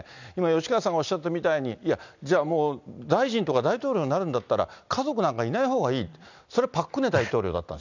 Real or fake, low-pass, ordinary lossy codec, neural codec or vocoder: real; 7.2 kHz; none; none